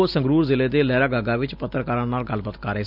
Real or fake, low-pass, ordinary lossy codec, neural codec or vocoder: real; 5.4 kHz; none; none